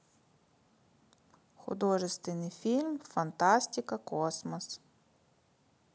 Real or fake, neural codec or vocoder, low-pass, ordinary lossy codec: real; none; none; none